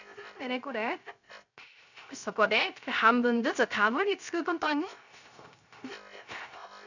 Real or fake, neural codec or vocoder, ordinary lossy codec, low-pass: fake; codec, 16 kHz, 0.3 kbps, FocalCodec; none; 7.2 kHz